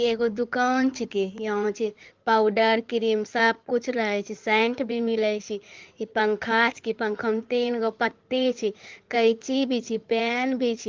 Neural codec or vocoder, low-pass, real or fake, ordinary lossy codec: codec, 16 kHz in and 24 kHz out, 2.2 kbps, FireRedTTS-2 codec; 7.2 kHz; fake; Opus, 32 kbps